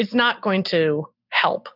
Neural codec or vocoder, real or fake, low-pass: none; real; 5.4 kHz